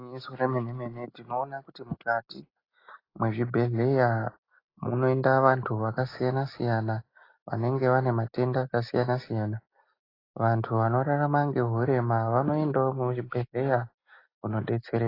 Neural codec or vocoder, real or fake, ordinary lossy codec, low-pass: none; real; AAC, 24 kbps; 5.4 kHz